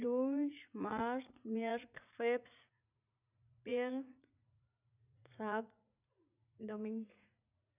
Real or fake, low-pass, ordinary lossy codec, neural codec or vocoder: fake; 3.6 kHz; none; vocoder, 44.1 kHz, 80 mel bands, Vocos